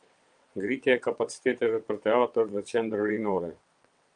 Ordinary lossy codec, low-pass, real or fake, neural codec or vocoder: Opus, 32 kbps; 9.9 kHz; fake; vocoder, 22.05 kHz, 80 mel bands, WaveNeXt